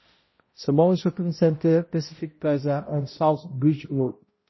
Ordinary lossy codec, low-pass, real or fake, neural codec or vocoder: MP3, 24 kbps; 7.2 kHz; fake; codec, 16 kHz, 0.5 kbps, X-Codec, HuBERT features, trained on balanced general audio